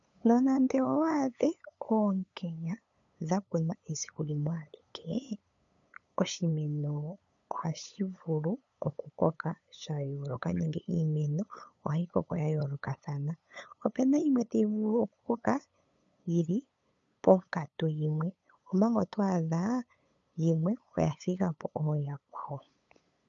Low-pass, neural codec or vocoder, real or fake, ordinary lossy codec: 7.2 kHz; codec, 16 kHz, 8 kbps, FunCodec, trained on LibriTTS, 25 frames a second; fake; MP3, 64 kbps